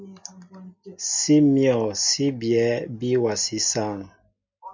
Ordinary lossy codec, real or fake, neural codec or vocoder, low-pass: MP3, 64 kbps; real; none; 7.2 kHz